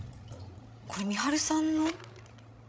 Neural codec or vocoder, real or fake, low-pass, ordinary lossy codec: codec, 16 kHz, 16 kbps, FreqCodec, larger model; fake; none; none